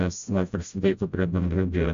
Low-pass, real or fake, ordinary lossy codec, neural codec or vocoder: 7.2 kHz; fake; MP3, 64 kbps; codec, 16 kHz, 0.5 kbps, FreqCodec, smaller model